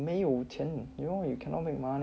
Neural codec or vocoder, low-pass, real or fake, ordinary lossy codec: none; none; real; none